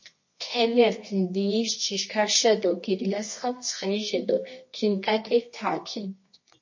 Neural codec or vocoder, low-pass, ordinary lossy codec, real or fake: codec, 24 kHz, 0.9 kbps, WavTokenizer, medium music audio release; 7.2 kHz; MP3, 32 kbps; fake